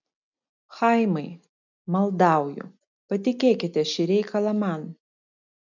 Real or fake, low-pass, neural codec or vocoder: real; 7.2 kHz; none